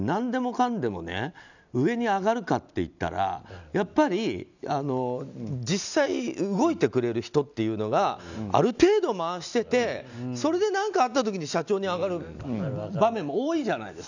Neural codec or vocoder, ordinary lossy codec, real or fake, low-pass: none; none; real; 7.2 kHz